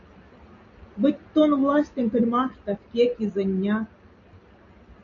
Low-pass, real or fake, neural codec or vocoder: 7.2 kHz; real; none